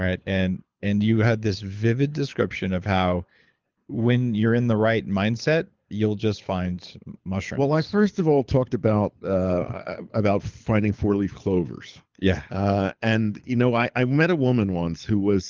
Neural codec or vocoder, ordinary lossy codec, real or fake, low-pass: codec, 24 kHz, 6 kbps, HILCodec; Opus, 32 kbps; fake; 7.2 kHz